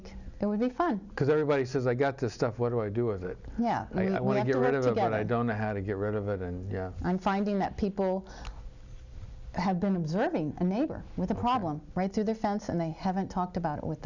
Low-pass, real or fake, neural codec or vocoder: 7.2 kHz; real; none